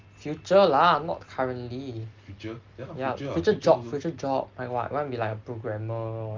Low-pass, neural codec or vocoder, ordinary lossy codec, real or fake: 7.2 kHz; none; Opus, 32 kbps; real